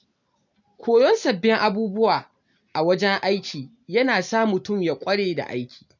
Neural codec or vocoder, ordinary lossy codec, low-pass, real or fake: none; none; 7.2 kHz; real